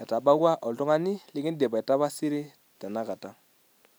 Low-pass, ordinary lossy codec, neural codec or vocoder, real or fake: none; none; none; real